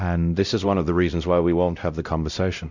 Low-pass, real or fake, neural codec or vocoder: 7.2 kHz; fake; codec, 16 kHz, 0.5 kbps, X-Codec, WavLM features, trained on Multilingual LibriSpeech